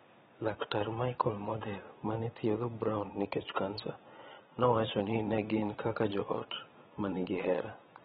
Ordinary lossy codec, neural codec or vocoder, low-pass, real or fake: AAC, 16 kbps; vocoder, 22.05 kHz, 80 mel bands, Vocos; 9.9 kHz; fake